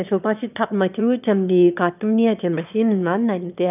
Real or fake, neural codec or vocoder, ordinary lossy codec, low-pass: fake; autoencoder, 22.05 kHz, a latent of 192 numbers a frame, VITS, trained on one speaker; none; 3.6 kHz